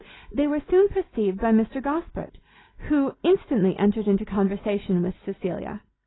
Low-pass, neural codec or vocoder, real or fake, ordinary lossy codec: 7.2 kHz; none; real; AAC, 16 kbps